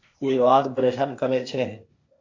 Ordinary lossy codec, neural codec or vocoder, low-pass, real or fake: MP3, 48 kbps; codec, 16 kHz, 0.8 kbps, ZipCodec; 7.2 kHz; fake